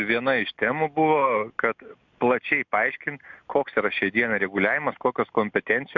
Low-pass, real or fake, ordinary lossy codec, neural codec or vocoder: 7.2 kHz; real; MP3, 64 kbps; none